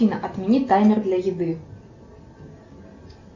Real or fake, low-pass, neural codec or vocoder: real; 7.2 kHz; none